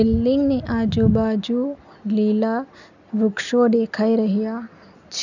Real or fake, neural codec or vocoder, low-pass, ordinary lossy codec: real; none; 7.2 kHz; none